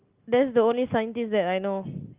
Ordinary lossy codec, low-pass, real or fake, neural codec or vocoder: Opus, 32 kbps; 3.6 kHz; real; none